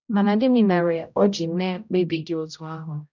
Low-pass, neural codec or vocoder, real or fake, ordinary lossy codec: 7.2 kHz; codec, 16 kHz, 0.5 kbps, X-Codec, HuBERT features, trained on general audio; fake; none